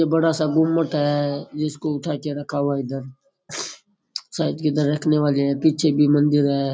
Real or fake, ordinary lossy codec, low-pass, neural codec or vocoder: real; none; none; none